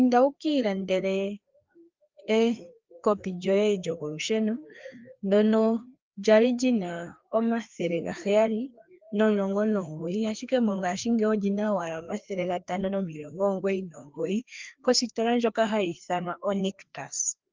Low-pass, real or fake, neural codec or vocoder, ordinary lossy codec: 7.2 kHz; fake; codec, 16 kHz, 2 kbps, FreqCodec, larger model; Opus, 32 kbps